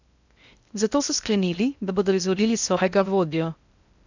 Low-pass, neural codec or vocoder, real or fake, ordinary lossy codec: 7.2 kHz; codec, 16 kHz in and 24 kHz out, 0.6 kbps, FocalCodec, streaming, 2048 codes; fake; none